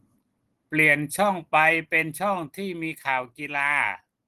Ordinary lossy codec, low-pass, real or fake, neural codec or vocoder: Opus, 16 kbps; 14.4 kHz; real; none